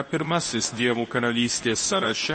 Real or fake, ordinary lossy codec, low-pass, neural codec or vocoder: fake; MP3, 32 kbps; 10.8 kHz; codec, 24 kHz, 0.9 kbps, WavTokenizer, medium speech release version 2